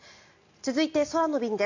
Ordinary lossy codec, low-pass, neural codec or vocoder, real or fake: AAC, 48 kbps; 7.2 kHz; none; real